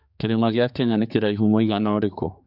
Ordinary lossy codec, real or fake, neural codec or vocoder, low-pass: none; fake; codec, 16 kHz, 4 kbps, X-Codec, HuBERT features, trained on general audio; 5.4 kHz